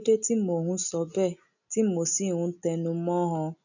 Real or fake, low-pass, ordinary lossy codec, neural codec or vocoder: real; 7.2 kHz; none; none